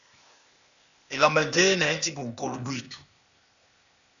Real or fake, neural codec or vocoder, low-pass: fake; codec, 16 kHz, 0.8 kbps, ZipCodec; 7.2 kHz